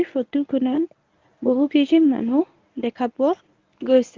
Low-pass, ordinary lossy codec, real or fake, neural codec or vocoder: 7.2 kHz; Opus, 32 kbps; fake; codec, 24 kHz, 0.9 kbps, WavTokenizer, medium speech release version 1